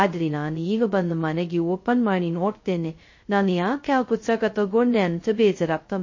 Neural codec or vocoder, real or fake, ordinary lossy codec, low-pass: codec, 16 kHz, 0.2 kbps, FocalCodec; fake; MP3, 32 kbps; 7.2 kHz